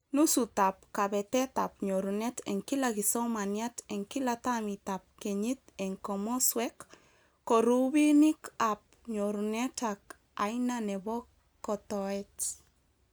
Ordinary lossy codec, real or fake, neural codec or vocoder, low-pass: none; real; none; none